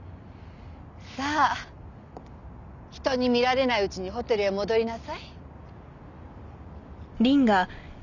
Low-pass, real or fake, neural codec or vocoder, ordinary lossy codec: 7.2 kHz; real; none; none